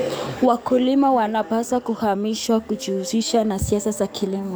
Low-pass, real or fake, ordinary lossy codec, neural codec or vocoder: none; fake; none; codec, 44.1 kHz, 7.8 kbps, DAC